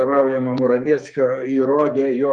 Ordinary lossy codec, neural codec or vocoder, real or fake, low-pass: Opus, 32 kbps; codec, 44.1 kHz, 2.6 kbps, SNAC; fake; 10.8 kHz